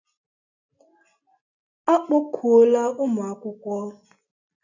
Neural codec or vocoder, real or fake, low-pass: none; real; 7.2 kHz